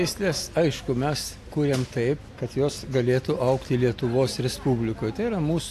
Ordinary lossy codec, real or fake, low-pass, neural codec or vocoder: AAC, 64 kbps; real; 14.4 kHz; none